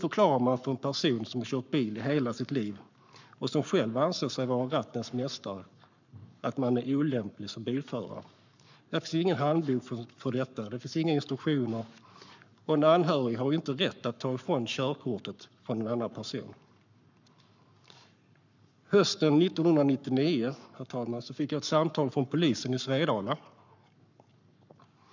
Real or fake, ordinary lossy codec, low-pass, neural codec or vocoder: fake; none; 7.2 kHz; codec, 44.1 kHz, 7.8 kbps, Pupu-Codec